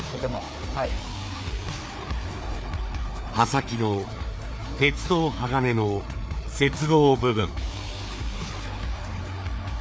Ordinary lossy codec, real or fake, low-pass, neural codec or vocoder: none; fake; none; codec, 16 kHz, 4 kbps, FreqCodec, larger model